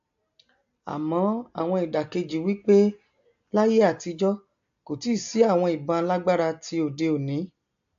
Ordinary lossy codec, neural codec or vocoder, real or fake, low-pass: AAC, 64 kbps; none; real; 7.2 kHz